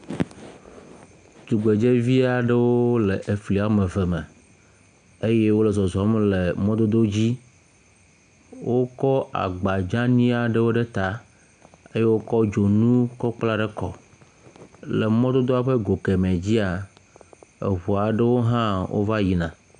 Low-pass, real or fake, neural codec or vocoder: 9.9 kHz; real; none